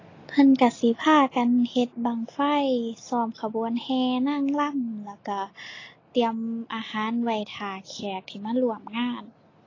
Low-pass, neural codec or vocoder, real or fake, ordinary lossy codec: 7.2 kHz; none; real; AAC, 32 kbps